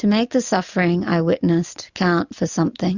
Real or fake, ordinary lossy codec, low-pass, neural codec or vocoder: real; Opus, 64 kbps; 7.2 kHz; none